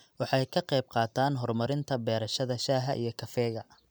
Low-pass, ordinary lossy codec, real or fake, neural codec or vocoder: none; none; real; none